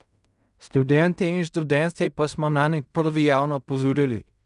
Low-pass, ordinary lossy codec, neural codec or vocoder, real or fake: 10.8 kHz; none; codec, 16 kHz in and 24 kHz out, 0.4 kbps, LongCat-Audio-Codec, fine tuned four codebook decoder; fake